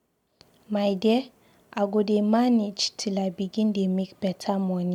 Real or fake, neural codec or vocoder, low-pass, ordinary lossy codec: real; none; 19.8 kHz; MP3, 96 kbps